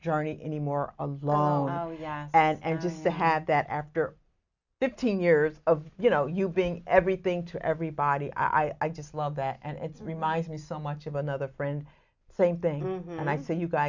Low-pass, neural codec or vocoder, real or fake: 7.2 kHz; none; real